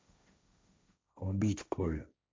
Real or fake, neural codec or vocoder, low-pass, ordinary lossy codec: fake; codec, 16 kHz, 1.1 kbps, Voila-Tokenizer; none; none